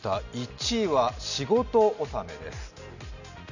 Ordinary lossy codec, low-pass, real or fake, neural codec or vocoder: none; 7.2 kHz; real; none